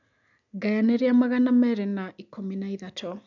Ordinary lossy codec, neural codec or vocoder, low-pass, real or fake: none; none; 7.2 kHz; real